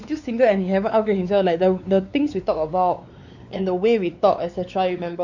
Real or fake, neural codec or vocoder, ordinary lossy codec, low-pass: fake; codec, 16 kHz, 4 kbps, X-Codec, WavLM features, trained on Multilingual LibriSpeech; none; 7.2 kHz